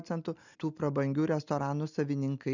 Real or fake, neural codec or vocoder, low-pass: real; none; 7.2 kHz